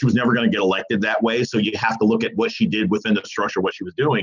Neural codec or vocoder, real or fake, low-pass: none; real; 7.2 kHz